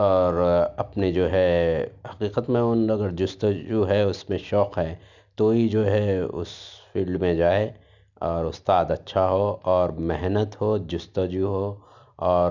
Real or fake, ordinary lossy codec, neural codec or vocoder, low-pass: real; none; none; 7.2 kHz